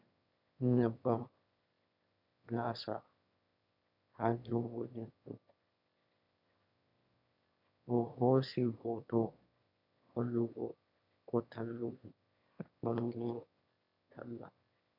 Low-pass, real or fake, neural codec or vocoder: 5.4 kHz; fake; autoencoder, 22.05 kHz, a latent of 192 numbers a frame, VITS, trained on one speaker